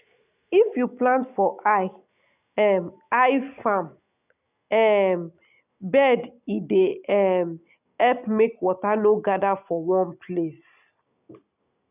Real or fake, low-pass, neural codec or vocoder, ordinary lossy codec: real; 3.6 kHz; none; none